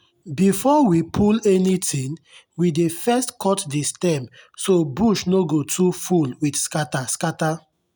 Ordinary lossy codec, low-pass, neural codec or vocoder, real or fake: none; none; none; real